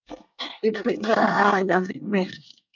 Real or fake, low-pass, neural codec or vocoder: fake; 7.2 kHz; codec, 24 kHz, 1 kbps, SNAC